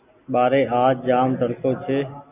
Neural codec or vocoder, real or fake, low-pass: none; real; 3.6 kHz